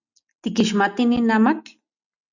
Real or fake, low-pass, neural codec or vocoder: real; 7.2 kHz; none